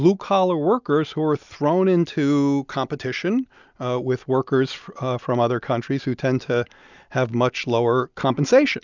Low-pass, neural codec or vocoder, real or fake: 7.2 kHz; none; real